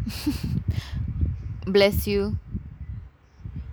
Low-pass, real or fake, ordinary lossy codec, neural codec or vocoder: none; real; none; none